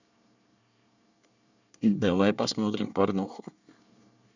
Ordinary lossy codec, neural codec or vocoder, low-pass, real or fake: none; codec, 24 kHz, 1 kbps, SNAC; 7.2 kHz; fake